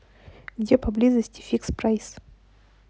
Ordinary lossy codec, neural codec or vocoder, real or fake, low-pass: none; none; real; none